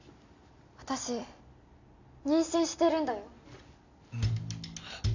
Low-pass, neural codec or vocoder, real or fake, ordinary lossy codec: 7.2 kHz; none; real; none